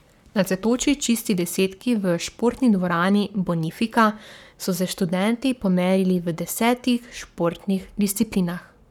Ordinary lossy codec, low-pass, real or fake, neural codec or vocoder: none; 19.8 kHz; fake; codec, 44.1 kHz, 7.8 kbps, Pupu-Codec